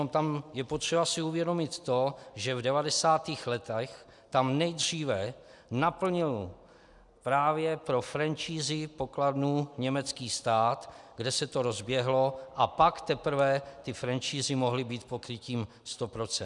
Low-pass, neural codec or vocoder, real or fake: 10.8 kHz; none; real